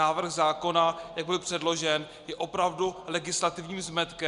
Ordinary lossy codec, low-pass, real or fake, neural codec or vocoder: Opus, 64 kbps; 10.8 kHz; real; none